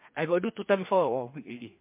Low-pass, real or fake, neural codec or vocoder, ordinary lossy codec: 3.6 kHz; fake; codec, 16 kHz, 2 kbps, FreqCodec, larger model; MP3, 24 kbps